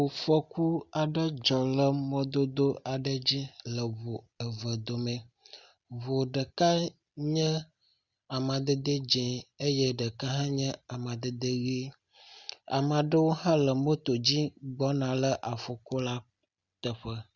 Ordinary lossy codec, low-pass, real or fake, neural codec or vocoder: Opus, 64 kbps; 7.2 kHz; real; none